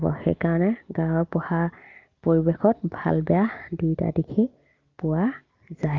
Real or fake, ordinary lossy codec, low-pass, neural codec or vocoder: real; Opus, 32 kbps; 7.2 kHz; none